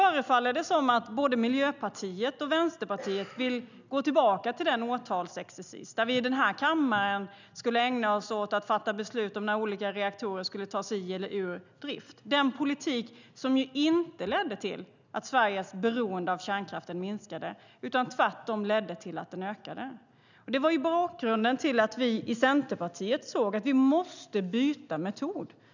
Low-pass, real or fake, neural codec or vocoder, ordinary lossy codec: 7.2 kHz; real; none; none